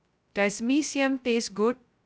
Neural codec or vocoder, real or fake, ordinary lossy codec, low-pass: codec, 16 kHz, 0.2 kbps, FocalCodec; fake; none; none